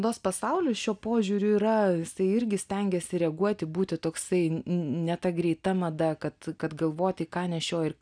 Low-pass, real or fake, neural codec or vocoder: 9.9 kHz; real; none